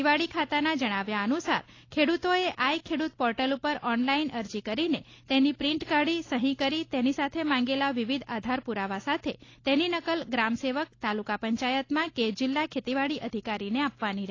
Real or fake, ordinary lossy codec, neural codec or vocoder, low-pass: real; AAC, 32 kbps; none; 7.2 kHz